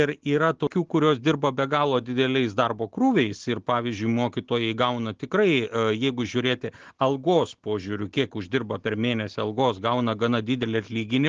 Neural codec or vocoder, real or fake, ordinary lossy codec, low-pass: none; real; Opus, 16 kbps; 7.2 kHz